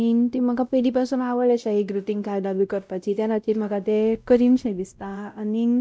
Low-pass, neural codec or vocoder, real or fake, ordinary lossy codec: none; codec, 16 kHz, 0.5 kbps, X-Codec, WavLM features, trained on Multilingual LibriSpeech; fake; none